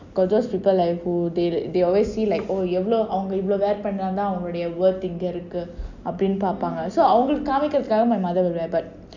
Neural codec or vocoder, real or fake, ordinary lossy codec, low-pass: none; real; none; 7.2 kHz